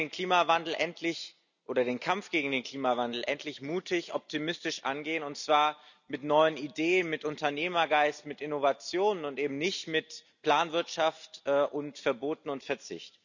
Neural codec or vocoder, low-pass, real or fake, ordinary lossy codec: none; 7.2 kHz; real; none